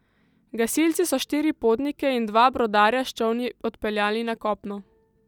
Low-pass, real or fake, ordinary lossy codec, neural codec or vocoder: 19.8 kHz; real; none; none